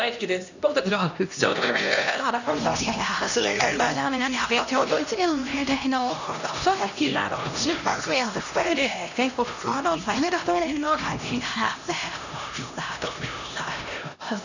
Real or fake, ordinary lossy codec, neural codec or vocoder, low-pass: fake; none; codec, 16 kHz, 1 kbps, X-Codec, HuBERT features, trained on LibriSpeech; 7.2 kHz